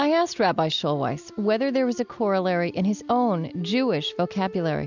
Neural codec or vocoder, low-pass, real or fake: none; 7.2 kHz; real